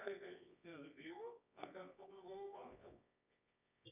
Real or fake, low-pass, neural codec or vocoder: fake; 3.6 kHz; codec, 24 kHz, 0.9 kbps, WavTokenizer, medium music audio release